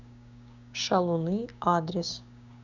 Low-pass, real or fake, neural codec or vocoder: 7.2 kHz; fake; codec, 16 kHz, 6 kbps, DAC